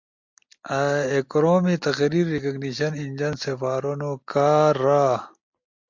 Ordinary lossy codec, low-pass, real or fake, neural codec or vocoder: MP3, 48 kbps; 7.2 kHz; real; none